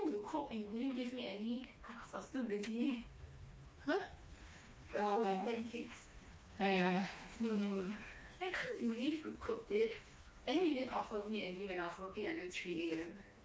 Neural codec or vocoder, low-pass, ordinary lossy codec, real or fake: codec, 16 kHz, 2 kbps, FreqCodec, smaller model; none; none; fake